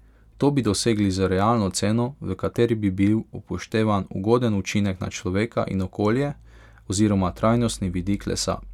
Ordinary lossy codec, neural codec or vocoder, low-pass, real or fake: none; none; 19.8 kHz; real